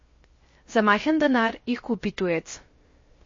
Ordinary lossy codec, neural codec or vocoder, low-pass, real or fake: MP3, 32 kbps; codec, 16 kHz, 0.3 kbps, FocalCodec; 7.2 kHz; fake